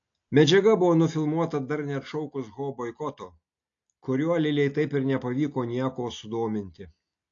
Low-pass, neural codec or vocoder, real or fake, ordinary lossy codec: 7.2 kHz; none; real; AAC, 48 kbps